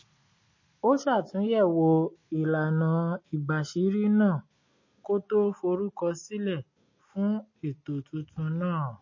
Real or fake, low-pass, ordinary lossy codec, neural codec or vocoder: real; 7.2 kHz; MP3, 32 kbps; none